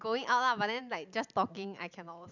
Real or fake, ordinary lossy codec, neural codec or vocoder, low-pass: real; none; none; 7.2 kHz